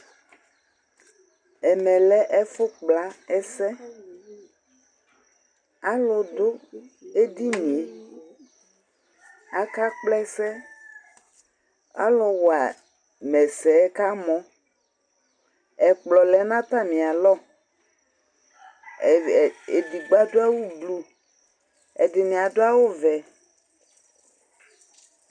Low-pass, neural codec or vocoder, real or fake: 9.9 kHz; none; real